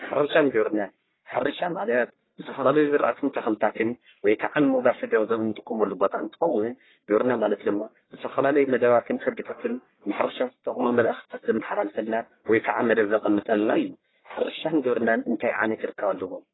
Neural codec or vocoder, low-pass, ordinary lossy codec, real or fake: codec, 44.1 kHz, 1.7 kbps, Pupu-Codec; 7.2 kHz; AAC, 16 kbps; fake